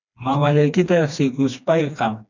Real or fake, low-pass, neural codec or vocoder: fake; 7.2 kHz; codec, 16 kHz, 2 kbps, FreqCodec, smaller model